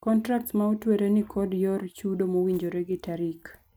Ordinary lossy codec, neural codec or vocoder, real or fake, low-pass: none; none; real; none